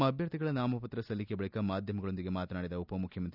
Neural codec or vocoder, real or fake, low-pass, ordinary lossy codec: none; real; 5.4 kHz; none